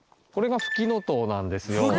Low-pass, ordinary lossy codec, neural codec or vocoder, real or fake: none; none; none; real